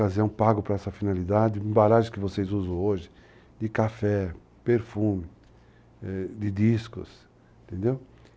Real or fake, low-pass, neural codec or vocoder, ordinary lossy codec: real; none; none; none